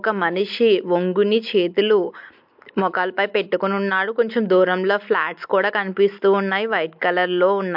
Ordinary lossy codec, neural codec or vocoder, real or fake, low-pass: MP3, 48 kbps; none; real; 5.4 kHz